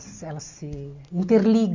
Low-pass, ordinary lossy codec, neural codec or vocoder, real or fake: 7.2 kHz; MP3, 48 kbps; none; real